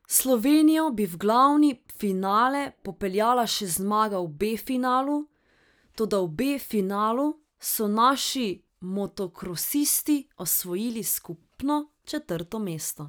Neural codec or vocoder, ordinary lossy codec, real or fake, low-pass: none; none; real; none